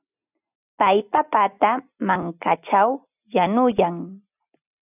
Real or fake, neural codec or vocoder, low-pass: real; none; 3.6 kHz